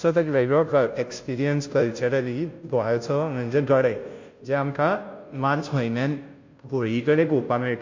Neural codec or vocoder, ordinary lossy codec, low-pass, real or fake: codec, 16 kHz, 0.5 kbps, FunCodec, trained on Chinese and English, 25 frames a second; MP3, 48 kbps; 7.2 kHz; fake